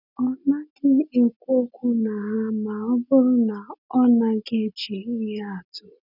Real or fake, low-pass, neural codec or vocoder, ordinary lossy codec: real; 5.4 kHz; none; none